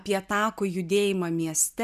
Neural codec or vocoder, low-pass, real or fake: none; 14.4 kHz; real